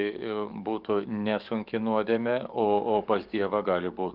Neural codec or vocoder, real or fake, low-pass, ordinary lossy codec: codec, 44.1 kHz, 7.8 kbps, DAC; fake; 5.4 kHz; Opus, 32 kbps